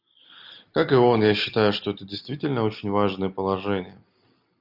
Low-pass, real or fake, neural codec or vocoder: 5.4 kHz; real; none